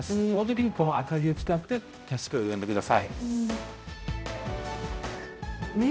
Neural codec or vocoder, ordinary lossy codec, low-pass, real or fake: codec, 16 kHz, 0.5 kbps, X-Codec, HuBERT features, trained on balanced general audio; none; none; fake